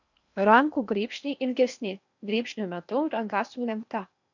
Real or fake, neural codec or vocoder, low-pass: fake; codec, 16 kHz in and 24 kHz out, 0.8 kbps, FocalCodec, streaming, 65536 codes; 7.2 kHz